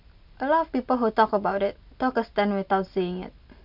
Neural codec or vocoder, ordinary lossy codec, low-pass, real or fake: none; MP3, 48 kbps; 5.4 kHz; real